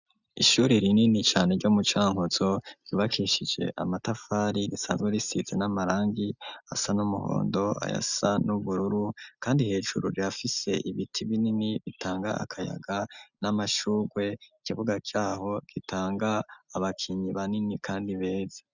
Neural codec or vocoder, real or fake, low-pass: none; real; 7.2 kHz